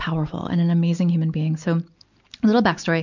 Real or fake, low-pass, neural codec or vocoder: real; 7.2 kHz; none